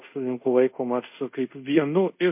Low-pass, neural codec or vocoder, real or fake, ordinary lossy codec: 3.6 kHz; codec, 24 kHz, 0.5 kbps, DualCodec; fake; MP3, 32 kbps